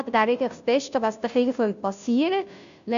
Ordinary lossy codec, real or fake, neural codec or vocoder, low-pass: none; fake; codec, 16 kHz, 0.5 kbps, FunCodec, trained on Chinese and English, 25 frames a second; 7.2 kHz